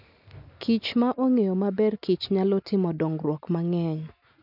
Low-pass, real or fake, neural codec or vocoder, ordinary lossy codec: 5.4 kHz; real; none; none